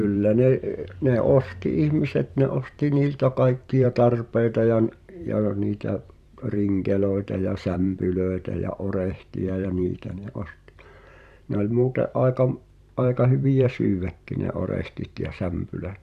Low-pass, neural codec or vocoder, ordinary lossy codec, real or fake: 14.4 kHz; vocoder, 44.1 kHz, 128 mel bands every 512 samples, BigVGAN v2; none; fake